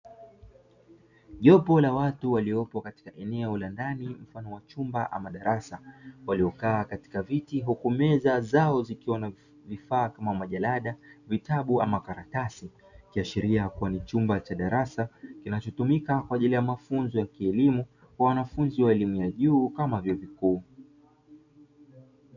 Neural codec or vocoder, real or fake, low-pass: none; real; 7.2 kHz